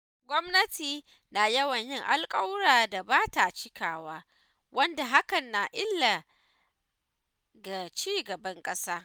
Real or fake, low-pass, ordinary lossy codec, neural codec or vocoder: real; none; none; none